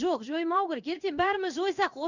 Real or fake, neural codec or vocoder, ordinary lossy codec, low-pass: fake; codec, 16 kHz in and 24 kHz out, 1 kbps, XY-Tokenizer; none; 7.2 kHz